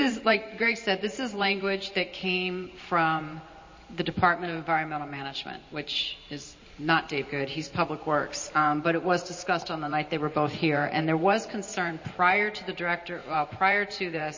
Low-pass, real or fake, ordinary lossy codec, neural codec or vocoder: 7.2 kHz; fake; MP3, 32 kbps; vocoder, 44.1 kHz, 128 mel bands every 512 samples, BigVGAN v2